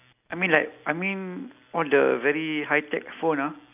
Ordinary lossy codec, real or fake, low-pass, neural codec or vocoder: none; real; 3.6 kHz; none